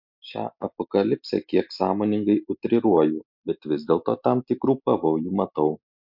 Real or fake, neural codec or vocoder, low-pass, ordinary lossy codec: real; none; 5.4 kHz; MP3, 48 kbps